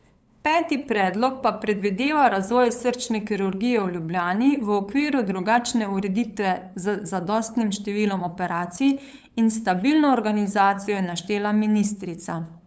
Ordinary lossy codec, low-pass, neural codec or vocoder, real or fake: none; none; codec, 16 kHz, 8 kbps, FunCodec, trained on LibriTTS, 25 frames a second; fake